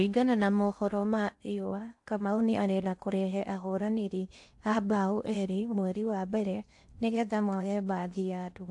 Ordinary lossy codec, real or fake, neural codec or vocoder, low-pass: AAC, 64 kbps; fake; codec, 16 kHz in and 24 kHz out, 0.6 kbps, FocalCodec, streaming, 4096 codes; 10.8 kHz